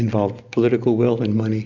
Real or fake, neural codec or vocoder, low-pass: real; none; 7.2 kHz